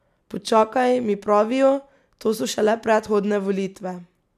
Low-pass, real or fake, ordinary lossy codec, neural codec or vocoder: 14.4 kHz; real; none; none